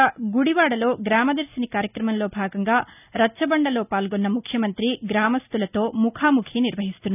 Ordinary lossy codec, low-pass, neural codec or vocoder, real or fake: none; 3.6 kHz; none; real